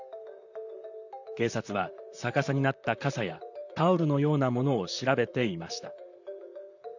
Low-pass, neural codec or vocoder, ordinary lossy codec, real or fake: 7.2 kHz; vocoder, 44.1 kHz, 128 mel bands, Pupu-Vocoder; none; fake